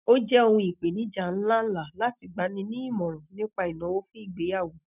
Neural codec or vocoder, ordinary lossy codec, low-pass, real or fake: none; none; 3.6 kHz; real